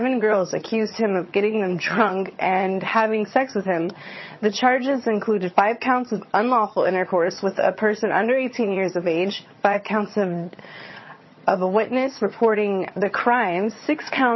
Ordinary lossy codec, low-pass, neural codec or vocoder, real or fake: MP3, 24 kbps; 7.2 kHz; vocoder, 22.05 kHz, 80 mel bands, HiFi-GAN; fake